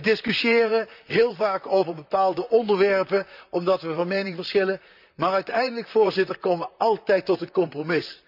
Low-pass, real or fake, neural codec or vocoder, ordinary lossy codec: 5.4 kHz; fake; vocoder, 44.1 kHz, 128 mel bands, Pupu-Vocoder; none